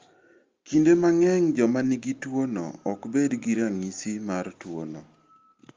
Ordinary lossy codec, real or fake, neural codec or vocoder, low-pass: Opus, 32 kbps; real; none; 7.2 kHz